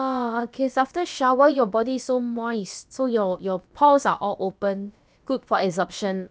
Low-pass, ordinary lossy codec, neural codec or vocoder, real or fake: none; none; codec, 16 kHz, about 1 kbps, DyCAST, with the encoder's durations; fake